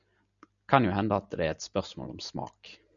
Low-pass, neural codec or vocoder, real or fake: 7.2 kHz; none; real